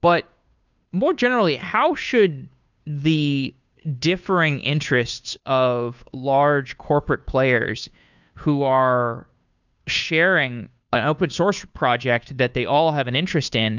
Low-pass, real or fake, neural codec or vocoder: 7.2 kHz; fake; codec, 16 kHz, 2 kbps, FunCodec, trained on Chinese and English, 25 frames a second